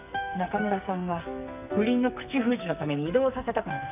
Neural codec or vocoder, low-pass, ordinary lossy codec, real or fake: codec, 44.1 kHz, 2.6 kbps, SNAC; 3.6 kHz; none; fake